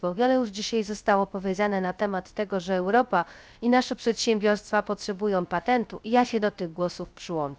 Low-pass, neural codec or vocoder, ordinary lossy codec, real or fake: none; codec, 16 kHz, 0.3 kbps, FocalCodec; none; fake